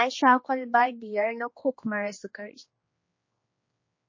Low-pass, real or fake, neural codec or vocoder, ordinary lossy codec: 7.2 kHz; fake; codec, 16 kHz, 2 kbps, X-Codec, HuBERT features, trained on general audio; MP3, 32 kbps